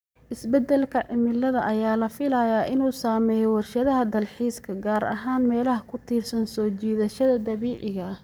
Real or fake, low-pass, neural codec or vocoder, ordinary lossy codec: fake; none; codec, 44.1 kHz, 7.8 kbps, Pupu-Codec; none